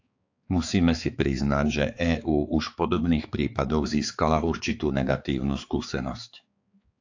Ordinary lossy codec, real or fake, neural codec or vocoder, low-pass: AAC, 48 kbps; fake; codec, 16 kHz, 4 kbps, X-Codec, HuBERT features, trained on balanced general audio; 7.2 kHz